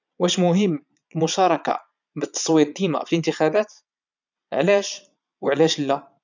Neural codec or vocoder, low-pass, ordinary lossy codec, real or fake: vocoder, 22.05 kHz, 80 mel bands, Vocos; 7.2 kHz; none; fake